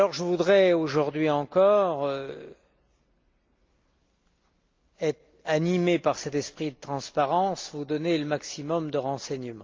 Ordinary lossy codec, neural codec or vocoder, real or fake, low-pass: Opus, 24 kbps; none; real; 7.2 kHz